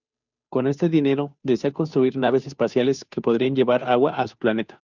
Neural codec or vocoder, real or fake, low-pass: codec, 16 kHz, 2 kbps, FunCodec, trained on Chinese and English, 25 frames a second; fake; 7.2 kHz